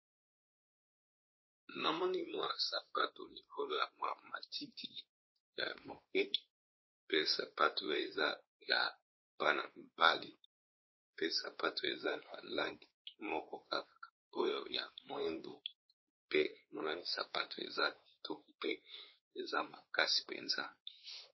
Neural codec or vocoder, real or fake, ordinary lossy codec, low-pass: codec, 16 kHz, 2 kbps, X-Codec, WavLM features, trained on Multilingual LibriSpeech; fake; MP3, 24 kbps; 7.2 kHz